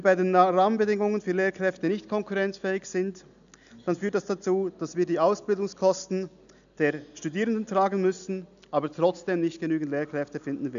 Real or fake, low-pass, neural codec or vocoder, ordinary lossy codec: real; 7.2 kHz; none; none